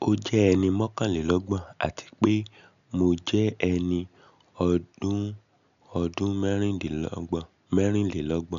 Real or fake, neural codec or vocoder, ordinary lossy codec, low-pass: real; none; none; 7.2 kHz